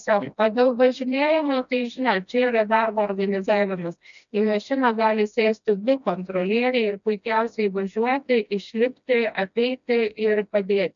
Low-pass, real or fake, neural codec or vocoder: 7.2 kHz; fake; codec, 16 kHz, 1 kbps, FreqCodec, smaller model